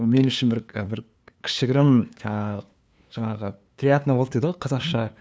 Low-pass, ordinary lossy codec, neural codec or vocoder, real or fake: none; none; codec, 16 kHz, 2 kbps, FunCodec, trained on LibriTTS, 25 frames a second; fake